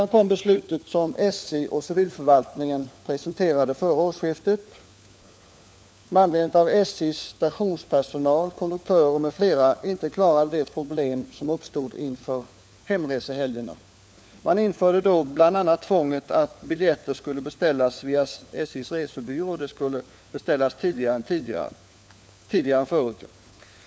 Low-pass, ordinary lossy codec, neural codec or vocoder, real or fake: none; none; codec, 16 kHz, 4 kbps, FunCodec, trained on LibriTTS, 50 frames a second; fake